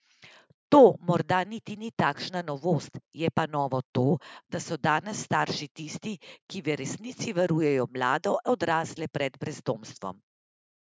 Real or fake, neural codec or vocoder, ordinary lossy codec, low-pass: real; none; none; none